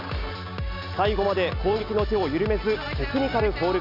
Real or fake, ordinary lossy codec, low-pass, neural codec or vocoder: real; none; 5.4 kHz; none